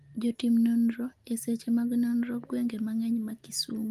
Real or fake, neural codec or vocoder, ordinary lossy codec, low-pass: real; none; Opus, 24 kbps; 14.4 kHz